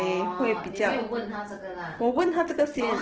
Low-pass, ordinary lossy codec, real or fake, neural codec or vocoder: 7.2 kHz; Opus, 16 kbps; real; none